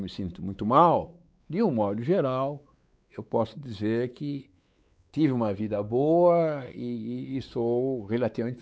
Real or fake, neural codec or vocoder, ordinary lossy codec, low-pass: fake; codec, 16 kHz, 4 kbps, X-Codec, WavLM features, trained on Multilingual LibriSpeech; none; none